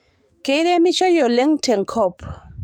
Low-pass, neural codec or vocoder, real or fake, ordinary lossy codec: 19.8 kHz; codec, 44.1 kHz, 7.8 kbps, DAC; fake; none